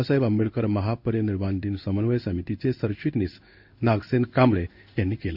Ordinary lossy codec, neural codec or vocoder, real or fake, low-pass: none; codec, 16 kHz in and 24 kHz out, 1 kbps, XY-Tokenizer; fake; 5.4 kHz